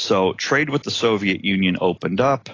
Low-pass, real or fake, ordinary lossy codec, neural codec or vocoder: 7.2 kHz; real; AAC, 32 kbps; none